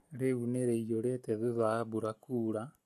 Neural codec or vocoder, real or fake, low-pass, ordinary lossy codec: none; real; 14.4 kHz; AAC, 64 kbps